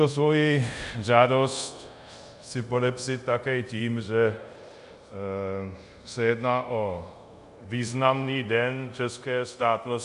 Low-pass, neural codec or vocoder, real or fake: 10.8 kHz; codec, 24 kHz, 0.5 kbps, DualCodec; fake